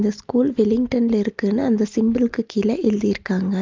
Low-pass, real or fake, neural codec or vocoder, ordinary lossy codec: 7.2 kHz; real; none; Opus, 32 kbps